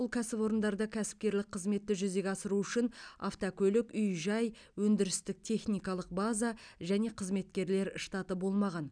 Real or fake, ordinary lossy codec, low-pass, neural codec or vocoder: real; none; 9.9 kHz; none